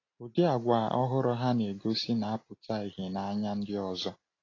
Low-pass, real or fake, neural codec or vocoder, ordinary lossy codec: 7.2 kHz; real; none; AAC, 32 kbps